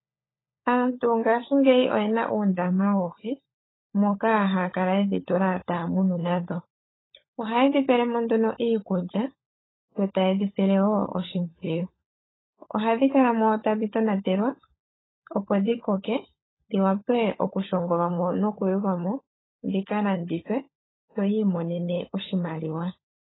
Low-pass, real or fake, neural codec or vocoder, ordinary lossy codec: 7.2 kHz; fake; codec, 16 kHz, 16 kbps, FunCodec, trained on LibriTTS, 50 frames a second; AAC, 16 kbps